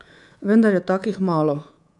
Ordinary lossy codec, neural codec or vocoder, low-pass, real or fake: none; codec, 24 kHz, 3.1 kbps, DualCodec; 10.8 kHz; fake